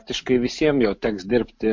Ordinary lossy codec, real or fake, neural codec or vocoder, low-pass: MP3, 48 kbps; real; none; 7.2 kHz